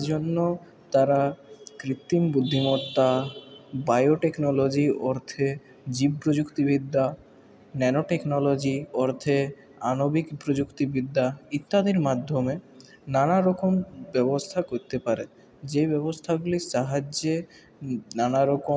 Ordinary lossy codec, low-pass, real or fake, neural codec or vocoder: none; none; real; none